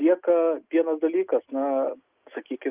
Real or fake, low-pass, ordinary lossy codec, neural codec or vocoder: real; 3.6 kHz; Opus, 24 kbps; none